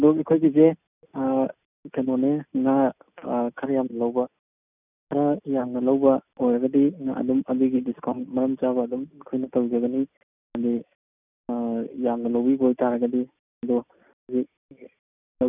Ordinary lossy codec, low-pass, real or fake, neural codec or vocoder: none; 3.6 kHz; real; none